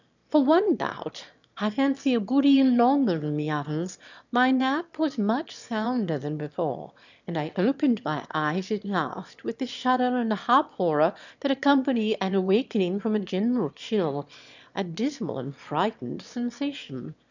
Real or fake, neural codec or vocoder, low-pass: fake; autoencoder, 22.05 kHz, a latent of 192 numbers a frame, VITS, trained on one speaker; 7.2 kHz